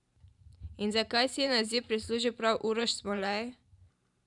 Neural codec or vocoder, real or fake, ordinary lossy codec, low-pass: vocoder, 44.1 kHz, 128 mel bands every 512 samples, BigVGAN v2; fake; none; 10.8 kHz